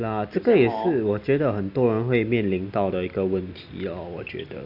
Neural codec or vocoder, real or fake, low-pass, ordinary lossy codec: none; real; 5.4 kHz; none